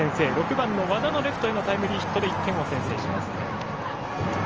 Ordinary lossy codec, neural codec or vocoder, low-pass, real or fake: Opus, 24 kbps; none; 7.2 kHz; real